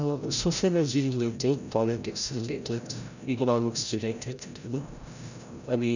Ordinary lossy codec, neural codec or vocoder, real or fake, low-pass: none; codec, 16 kHz, 0.5 kbps, FreqCodec, larger model; fake; 7.2 kHz